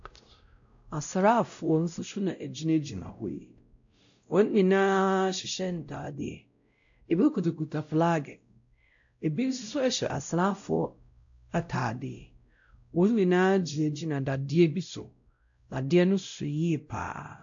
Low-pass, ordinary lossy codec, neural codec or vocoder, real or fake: 7.2 kHz; AAC, 64 kbps; codec, 16 kHz, 0.5 kbps, X-Codec, WavLM features, trained on Multilingual LibriSpeech; fake